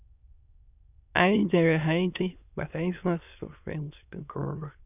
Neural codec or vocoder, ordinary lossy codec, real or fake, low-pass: autoencoder, 22.05 kHz, a latent of 192 numbers a frame, VITS, trained on many speakers; none; fake; 3.6 kHz